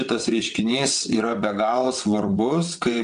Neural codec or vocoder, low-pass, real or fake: vocoder, 22.05 kHz, 80 mel bands, WaveNeXt; 9.9 kHz; fake